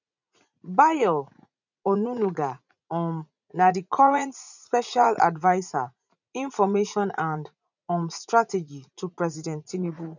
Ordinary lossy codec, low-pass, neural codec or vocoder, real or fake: none; 7.2 kHz; vocoder, 44.1 kHz, 80 mel bands, Vocos; fake